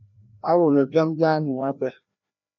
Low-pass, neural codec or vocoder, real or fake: 7.2 kHz; codec, 16 kHz, 1 kbps, FreqCodec, larger model; fake